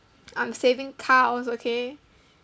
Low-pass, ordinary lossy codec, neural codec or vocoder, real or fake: none; none; none; real